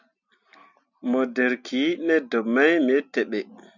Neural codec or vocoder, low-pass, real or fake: none; 7.2 kHz; real